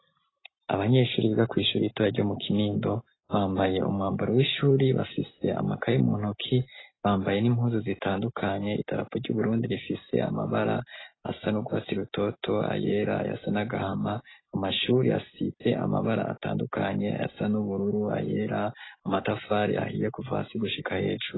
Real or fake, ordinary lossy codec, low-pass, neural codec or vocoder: real; AAC, 16 kbps; 7.2 kHz; none